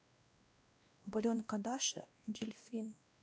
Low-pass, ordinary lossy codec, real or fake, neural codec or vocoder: none; none; fake; codec, 16 kHz, 1 kbps, X-Codec, WavLM features, trained on Multilingual LibriSpeech